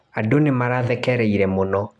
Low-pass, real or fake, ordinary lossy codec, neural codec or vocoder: none; real; none; none